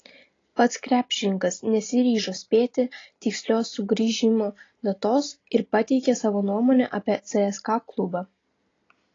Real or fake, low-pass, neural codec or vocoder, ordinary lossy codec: real; 7.2 kHz; none; AAC, 32 kbps